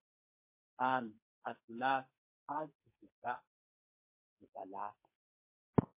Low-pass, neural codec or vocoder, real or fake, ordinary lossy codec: 3.6 kHz; codec, 44.1 kHz, 2.6 kbps, SNAC; fake; MP3, 24 kbps